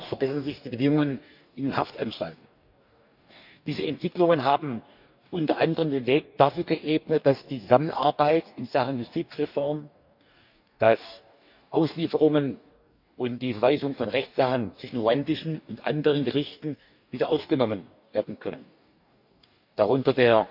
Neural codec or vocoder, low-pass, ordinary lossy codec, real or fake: codec, 44.1 kHz, 2.6 kbps, DAC; 5.4 kHz; none; fake